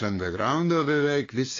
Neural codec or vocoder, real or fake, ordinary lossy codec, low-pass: codec, 16 kHz, 2 kbps, FunCodec, trained on LibriTTS, 25 frames a second; fake; AAC, 32 kbps; 7.2 kHz